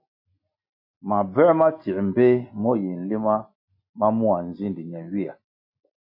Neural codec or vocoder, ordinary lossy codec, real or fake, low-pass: none; MP3, 32 kbps; real; 5.4 kHz